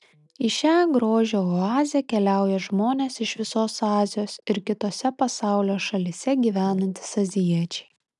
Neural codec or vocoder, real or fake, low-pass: none; real; 10.8 kHz